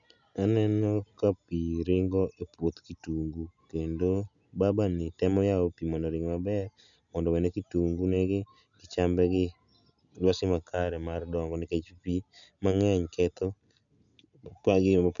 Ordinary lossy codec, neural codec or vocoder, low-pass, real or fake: none; none; 7.2 kHz; real